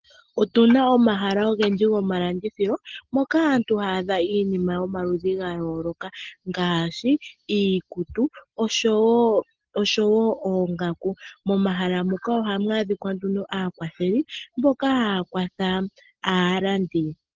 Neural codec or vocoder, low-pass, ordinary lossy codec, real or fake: none; 7.2 kHz; Opus, 16 kbps; real